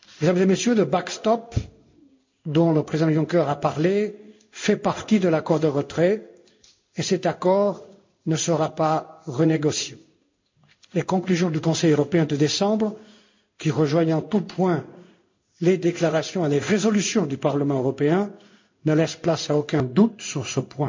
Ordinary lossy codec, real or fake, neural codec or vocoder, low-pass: MP3, 64 kbps; fake; codec, 16 kHz in and 24 kHz out, 1 kbps, XY-Tokenizer; 7.2 kHz